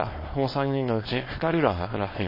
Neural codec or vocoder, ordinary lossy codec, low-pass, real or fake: codec, 24 kHz, 0.9 kbps, WavTokenizer, small release; MP3, 24 kbps; 5.4 kHz; fake